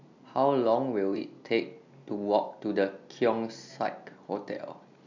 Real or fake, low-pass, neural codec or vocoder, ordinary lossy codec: real; 7.2 kHz; none; MP3, 64 kbps